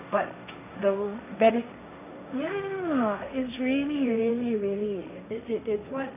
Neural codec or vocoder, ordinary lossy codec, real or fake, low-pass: codec, 16 kHz, 1.1 kbps, Voila-Tokenizer; none; fake; 3.6 kHz